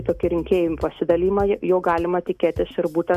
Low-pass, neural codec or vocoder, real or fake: 14.4 kHz; none; real